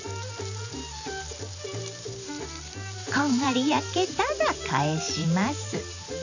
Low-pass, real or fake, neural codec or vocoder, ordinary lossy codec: 7.2 kHz; fake; vocoder, 44.1 kHz, 128 mel bands every 512 samples, BigVGAN v2; none